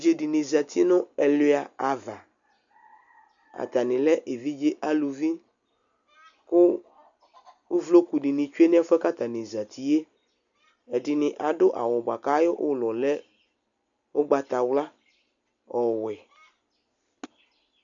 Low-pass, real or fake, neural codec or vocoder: 7.2 kHz; real; none